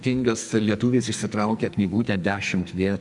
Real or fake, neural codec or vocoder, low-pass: fake; codec, 44.1 kHz, 2.6 kbps, SNAC; 10.8 kHz